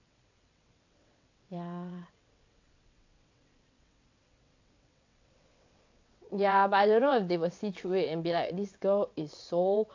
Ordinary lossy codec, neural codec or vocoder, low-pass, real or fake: AAC, 48 kbps; vocoder, 22.05 kHz, 80 mel bands, WaveNeXt; 7.2 kHz; fake